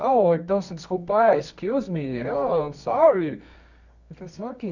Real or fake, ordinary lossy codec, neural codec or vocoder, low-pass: fake; none; codec, 24 kHz, 0.9 kbps, WavTokenizer, medium music audio release; 7.2 kHz